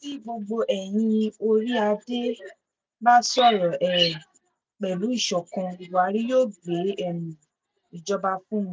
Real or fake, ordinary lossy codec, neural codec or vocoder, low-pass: real; none; none; none